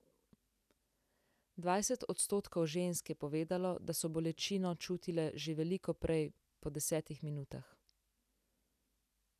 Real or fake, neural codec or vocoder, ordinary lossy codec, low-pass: real; none; none; 14.4 kHz